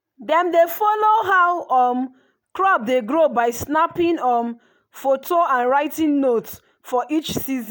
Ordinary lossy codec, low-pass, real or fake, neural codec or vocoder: none; none; real; none